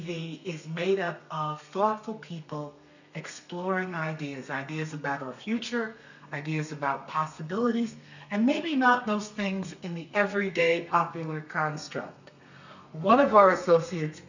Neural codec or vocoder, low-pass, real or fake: codec, 32 kHz, 1.9 kbps, SNAC; 7.2 kHz; fake